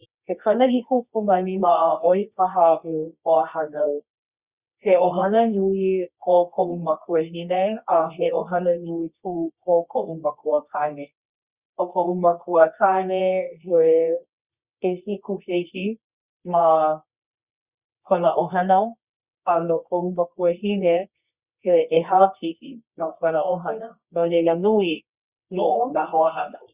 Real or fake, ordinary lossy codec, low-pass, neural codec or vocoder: fake; Opus, 64 kbps; 3.6 kHz; codec, 24 kHz, 0.9 kbps, WavTokenizer, medium music audio release